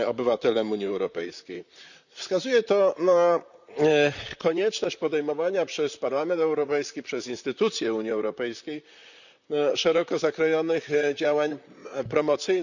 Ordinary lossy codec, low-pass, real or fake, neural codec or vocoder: none; 7.2 kHz; fake; vocoder, 44.1 kHz, 128 mel bands, Pupu-Vocoder